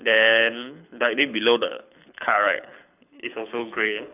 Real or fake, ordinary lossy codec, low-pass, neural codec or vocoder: fake; none; 3.6 kHz; codec, 24 kHz, 6 kbps, HILCodec